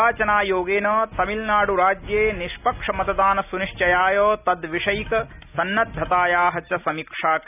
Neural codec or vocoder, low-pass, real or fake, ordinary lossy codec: none; 3.6 kHz; real; none